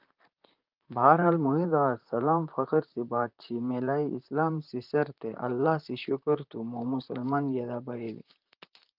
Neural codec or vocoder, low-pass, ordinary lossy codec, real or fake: vocoder, 44.1 kHz, 128 mel bands, Pupu-Vocoder; 5.4 kHz; Opus, 24 kbps; fake